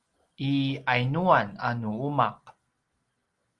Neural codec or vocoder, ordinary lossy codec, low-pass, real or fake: none; Opus, 24 kbps; 10.8 kHz; real